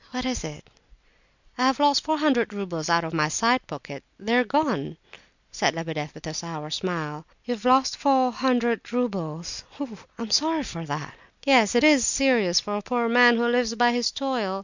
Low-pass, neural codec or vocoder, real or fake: 7.2 kHz; none; real